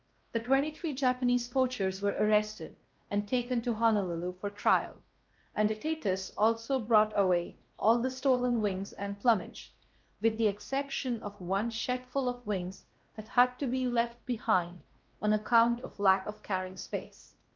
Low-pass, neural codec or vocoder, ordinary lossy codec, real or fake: 7.2 kHz; codec, 16 kHz, 1 kbps, X-Codec, WavLM features, trained on Multilingual LibriSpeech; Opus, 32 kbps; fake